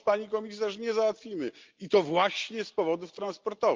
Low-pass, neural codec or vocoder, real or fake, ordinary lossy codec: 7.2 kHz; none; real; Opus, 32 kbps